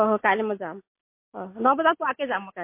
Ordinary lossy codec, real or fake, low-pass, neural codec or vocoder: MP3, 24 kbps; real; 3.6 kHz; none